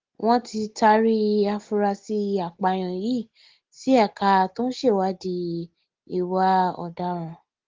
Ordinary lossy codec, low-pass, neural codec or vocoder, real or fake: Opus, 16 kbps; 7.2 kHz; none; real